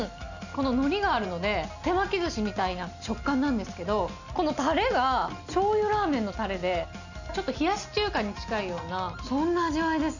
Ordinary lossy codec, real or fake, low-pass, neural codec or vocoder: none; real; 7.2 kHz; none